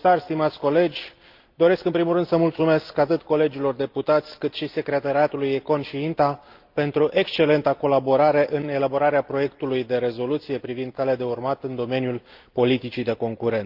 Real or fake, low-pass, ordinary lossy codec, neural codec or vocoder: real; 5.4 kHz; Opus, 32 kbps; none